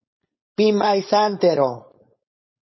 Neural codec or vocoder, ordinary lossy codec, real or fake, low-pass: codec, 16 kHz, 4.8 kbps, FACodec; MP3, 24 kbps; fake; 7.2 kHz